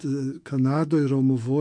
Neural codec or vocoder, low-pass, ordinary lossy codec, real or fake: autoencoder, 48 kHz, 128 numbers a frame, DAC-VAE, trained on Japanese speech; 9.9 kHz; MP3, 64 kbps; fake